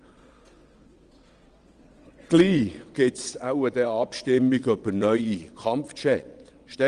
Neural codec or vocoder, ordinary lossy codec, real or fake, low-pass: vocoder, 22.05 kHz, 80 mel bands, WaveNeXt; Opus, 24 kbps; fake; 9.9 kHz